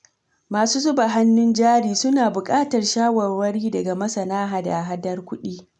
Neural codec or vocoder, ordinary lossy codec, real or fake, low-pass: none; none; real; 10.8 kHz